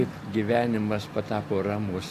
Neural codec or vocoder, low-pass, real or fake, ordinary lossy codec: none; 14.4 kHz; real; AAC, 64 kbps